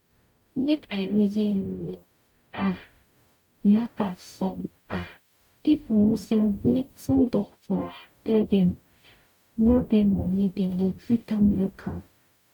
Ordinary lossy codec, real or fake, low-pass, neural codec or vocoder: none; fake; 19.8 kHz; codec, 44.1 kHz, 0.9 kbps, DAC